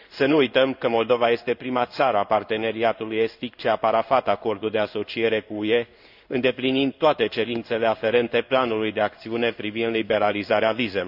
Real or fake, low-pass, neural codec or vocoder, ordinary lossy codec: fake; 5.4 kHz; codec, 16 kHz in and 24 kHz out, 1 kbps, XY-Tokenizer; none